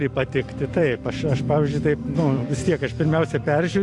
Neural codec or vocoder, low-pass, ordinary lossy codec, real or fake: vocoder, 44.1 kHz, 128 mel bands every 256 samples, BigVGAN v2; 10.8 kHz; AAC, 64 kbps; fake